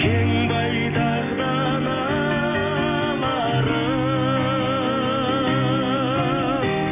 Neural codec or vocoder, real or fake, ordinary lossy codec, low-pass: none; real; none; 3.6 kHz